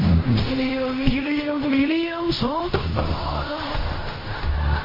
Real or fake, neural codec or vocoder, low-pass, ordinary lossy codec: fake; codec, 16 kHz in and 24 kHz out, 0.4 kbps, LongCat-Audio-Codec, fine tuned four codebook decoder; 5.4 kHz; MP3, 24 kbps